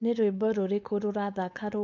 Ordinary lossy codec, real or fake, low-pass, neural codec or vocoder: none; fake; none; codec, 16 kHz, 4.8 kbps, FACodec